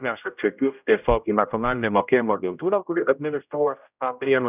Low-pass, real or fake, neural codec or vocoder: 3.6 kHz; fake; codec, 16 kHz, 0.5 kbps, X-Codec, HuBERT features, trained on general audio